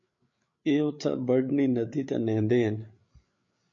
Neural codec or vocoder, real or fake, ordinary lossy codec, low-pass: codec, 16 kHz, 8 kbps, FreqCodec, larger model; fake; MP3, 48 kbps; 7.2 kHz